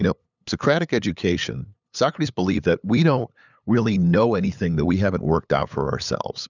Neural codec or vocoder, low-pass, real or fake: codec, 16 kHz, 8 kbps, FunCodec, trained on LibriTTS, 25 frames a second; 7.2 kHz; fake